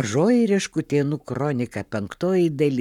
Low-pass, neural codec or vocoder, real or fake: 19.8 kHz; codec, 44.1 kHz, 7.8 kbps, Pupu-Codec; fake